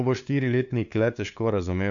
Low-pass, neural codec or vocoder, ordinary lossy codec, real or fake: 7.2 kHz; codec, 16 kHz, 2 kbps, FunCodec, trained on LibriTTS, 25 frames a second; none; fake